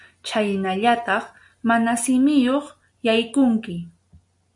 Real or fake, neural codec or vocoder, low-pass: real; none; 10.8 kHz